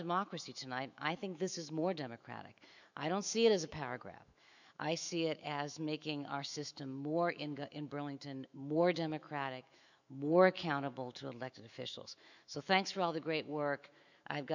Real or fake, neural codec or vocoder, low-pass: fake; autoencoder, 48 kHz, 128 numbers a frame, DAC-VAE, trained on Japanese speech; 7.2 kHz